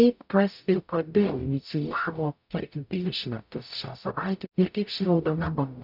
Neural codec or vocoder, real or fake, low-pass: codec, 44.1 kHz, 0.9 kbps, DAC; fake; 5.4 kHz